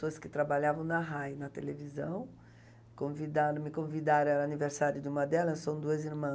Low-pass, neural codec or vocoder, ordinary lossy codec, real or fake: none; none; none; real